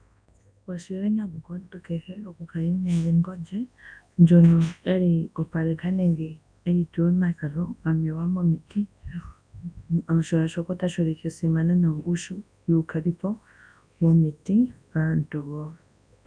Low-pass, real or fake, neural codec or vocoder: 9.9 kHz; fake; codec, 24 kHz, 0.9 kbps, WavTokenizer, large speech release